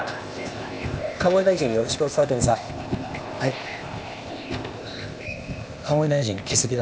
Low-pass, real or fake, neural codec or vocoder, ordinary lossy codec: none; fake; codec, 16 kHz, 0.8 kbps, ZipCodec; none